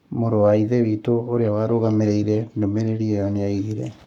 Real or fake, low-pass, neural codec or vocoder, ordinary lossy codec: fake; 19.8 kHz; codec, 44.1 kHz, 7.8 kbps, Pupu-Codec; none